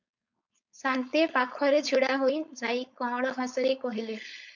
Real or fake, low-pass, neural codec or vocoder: fake; 7.2 kHz; codec, 16 kHz, 4.8 kbps, FACodec